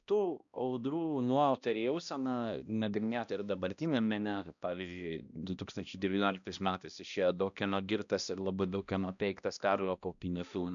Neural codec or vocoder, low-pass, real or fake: codec, 16 kHz, 1 kbps, X-Codec, HuBERT features, trained on balanced general audio; 7.2 kHz; fake